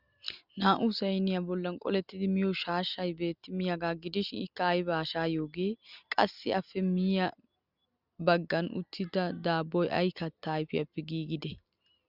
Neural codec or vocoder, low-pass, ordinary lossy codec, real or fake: none; 5.4 kHz; Opus, 64 kbps; real